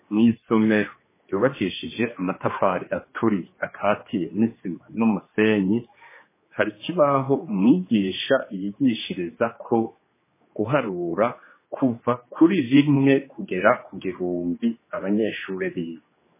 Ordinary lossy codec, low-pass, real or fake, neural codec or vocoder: MP3, 16 kbps; 3.6 kHz; fake; codec, 16 kHz, 2 kbps, X-Codec, HuBERT features, trained on general audio